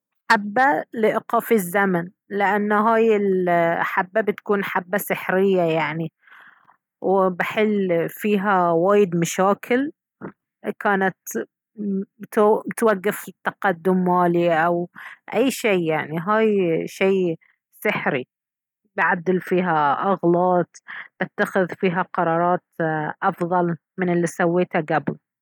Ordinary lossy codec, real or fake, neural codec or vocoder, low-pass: none; real; none; 19.8 kHz